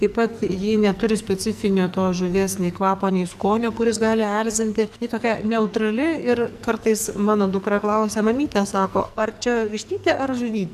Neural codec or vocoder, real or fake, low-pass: codec, 44.1 kHz, 2.6 kbps, SNAC; fake; 14.4 kHz